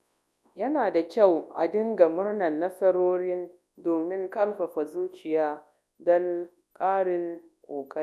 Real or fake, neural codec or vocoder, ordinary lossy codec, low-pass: fake; codec, 24 kHz, 0.9 kbps, WavTokenizer, large speech release; none; none